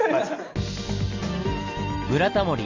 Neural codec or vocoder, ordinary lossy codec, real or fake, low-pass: none; Opus, 32 kbps; real; 7.2 kHz